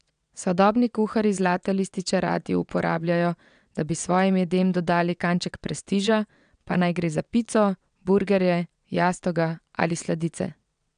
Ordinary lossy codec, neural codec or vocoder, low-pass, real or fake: none; vocoder, 22.05 kHz, 80 mel bands, WaveNeXt; 9.9 kHz; fake